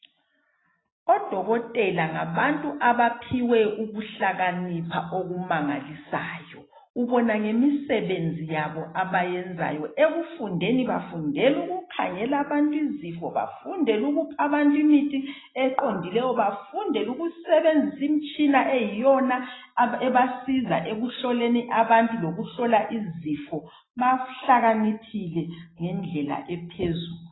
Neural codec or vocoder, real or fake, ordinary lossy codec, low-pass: none; real; AAC, 16 kbps; 7.2 kHz